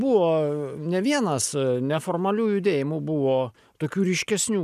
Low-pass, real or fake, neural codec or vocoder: 14.4 kHz; real; none